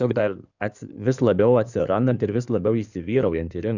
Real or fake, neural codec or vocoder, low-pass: fake; codec, 16 kHz in and 24 kHz out, 2.2 kbps, FireRedTTS-2 codec; 7.2 kHz